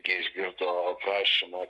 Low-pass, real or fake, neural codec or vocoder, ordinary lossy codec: 10.8 kHz; fake; codec, 44.1 kHz, 7.8 kbps, Pupu-Codec; Opus, 64 kbps